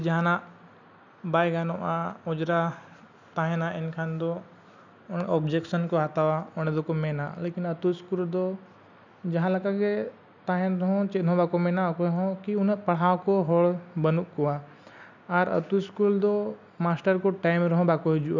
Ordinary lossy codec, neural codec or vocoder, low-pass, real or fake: none; none; 7.2 kHz; real